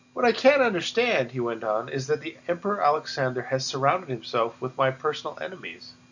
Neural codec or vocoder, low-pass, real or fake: none; 7.2 kHz; real